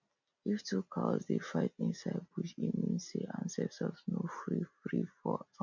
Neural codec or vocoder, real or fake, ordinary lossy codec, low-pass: none; real; none; 7.2 kHz